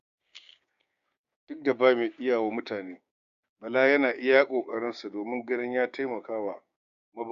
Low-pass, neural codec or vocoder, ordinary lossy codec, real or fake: 7.2 kHz; codec, 16 kHz, 6 kbps, DAC; Opus, 64 kbps; fake